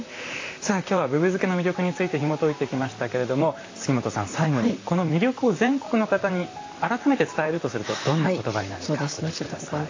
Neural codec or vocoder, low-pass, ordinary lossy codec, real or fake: vocoder, 44.1 kHz, 128 mel bands, Pupu-Vocoder; 7.2 kHz; AAC, 32 kbps; fake